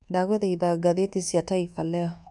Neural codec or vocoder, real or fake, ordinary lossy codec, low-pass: codec, 24 kHz, 1.2 kbps, DualCodec; fake; MP3, 96 kbps; 10.8 kHz